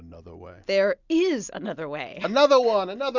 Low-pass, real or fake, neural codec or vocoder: 7.2 kHz; real; none